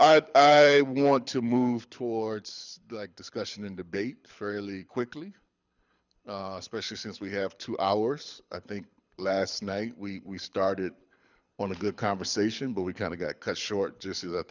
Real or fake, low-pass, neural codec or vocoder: fake; 7.2 kHz; codec, 24 kHz, 6 kbps, HILCodec